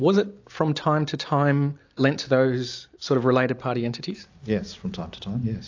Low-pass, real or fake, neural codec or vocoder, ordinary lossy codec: 7.2 kHz; real; none; AAC, 48 kbps